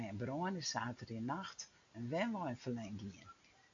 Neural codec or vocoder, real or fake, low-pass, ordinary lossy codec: none; real; 7.2 kHz; AAC, 64 kbps